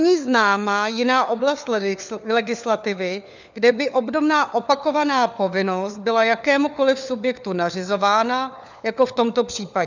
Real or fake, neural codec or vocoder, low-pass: fake; codec, 16 kHz, 4 kbps, FunCodec, trained on LibriTTS, 50 frames a second; 7.2 kHz